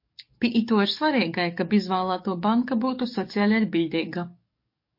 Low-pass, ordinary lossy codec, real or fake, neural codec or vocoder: 5.4 kHz; MP3, 32 kbps; fake; codec, 44.1 kHz, 7.8 kbps, DAC